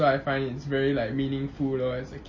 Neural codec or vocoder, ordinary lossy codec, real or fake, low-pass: none; MP3, 32 kbps; real; 7.2 kHz